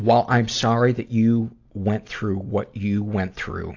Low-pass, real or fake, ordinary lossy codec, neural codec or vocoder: 7.2 kHz; real; AAC, 48 kbps; none